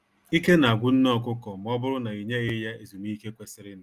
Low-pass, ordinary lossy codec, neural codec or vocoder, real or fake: 14.4 kHz; Opus, 32 kbps; none; real